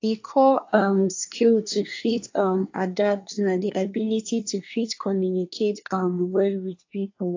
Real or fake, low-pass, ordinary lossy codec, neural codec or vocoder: fake; 7.2 kHz; AAC, 48 kbps; codec, 24 kHz, 1 kbps, SNAC